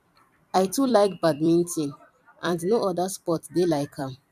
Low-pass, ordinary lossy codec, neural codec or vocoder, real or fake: 14.4 kHz; none; vocoder, 44.1 kHz, 128 mel bands every 256 samples, BigVGAN v2; fake